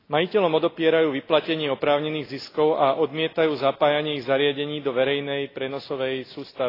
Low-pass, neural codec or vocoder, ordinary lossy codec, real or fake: 5.4 kHz; none; AAC, 24 kbps; real